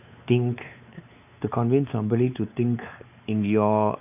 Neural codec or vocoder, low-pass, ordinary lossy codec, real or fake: codec, 16 kHz, 4 kbps, X-Codec, WavLM features, trained on Multilingual LibriSpeech; 3.6 kHz; none; fake